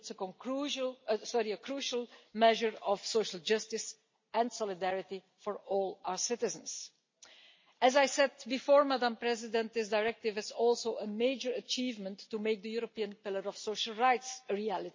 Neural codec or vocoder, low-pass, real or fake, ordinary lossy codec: none; 7.2 kHz; real; MP3, 32 kbps